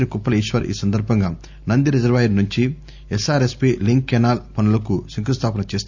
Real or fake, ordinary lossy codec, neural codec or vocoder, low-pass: real; MP3, 64 kbps; none; 7.2 kHz